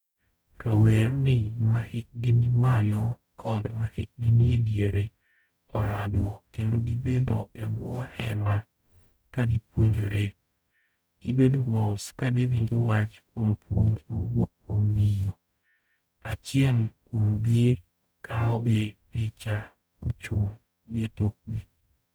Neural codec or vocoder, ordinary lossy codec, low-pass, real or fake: codec, 44.1 kHz, 0.9 kbps, DAC; none; none; fake